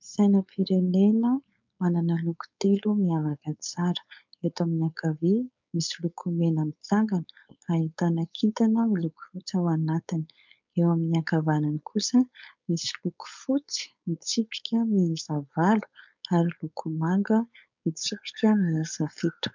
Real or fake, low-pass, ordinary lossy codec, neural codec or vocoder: fake; 7.2 kHz; MP3, 64 kbps; codec, 16 kHz, 4.8 kbps, FACodec